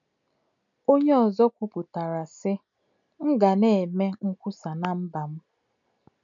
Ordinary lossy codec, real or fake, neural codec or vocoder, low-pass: none; real; none; 7.2 kHz